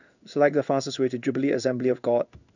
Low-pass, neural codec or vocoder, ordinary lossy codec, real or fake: 7.2 kHz; codec, 16 kHz in and 24 kHz out, 1 kbps, XY-Tokenizer; none; fake